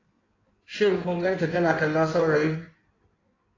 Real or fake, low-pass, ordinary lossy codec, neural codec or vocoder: fake; 7.2 kHz; AAC, 32 kbps; codec, 16 kHz in and 24 kHz out, 1.1 kbps, FireRedTTS-2 codec